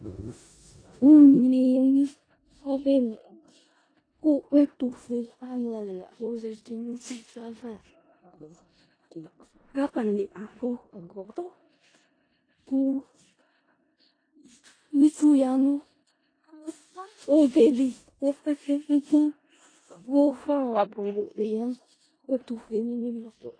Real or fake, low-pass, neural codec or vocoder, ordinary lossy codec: fake; 9.9 kHz; codec, 16 kHz in and 24 kHz out, 0.4 kbps, LongCat-Audio-Codec, four codebook decoder; AAC, 32 kbps